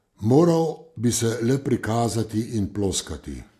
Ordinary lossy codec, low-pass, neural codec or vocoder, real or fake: AAC, 64 kbps; 14.4 kHz; none; real